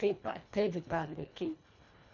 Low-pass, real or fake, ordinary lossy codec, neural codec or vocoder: 7.2 kHz; fake; none; codec, 24 kHz, 1.5 kbps, HILCodec